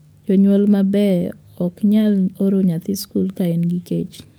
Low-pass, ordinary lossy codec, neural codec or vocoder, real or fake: none; none; codec, 44.1 kHz, 7.8 kbps, DAC; fake